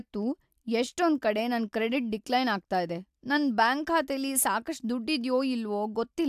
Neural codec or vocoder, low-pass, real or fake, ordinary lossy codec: none; 14.4 kHz; real; AAC, 96 kbps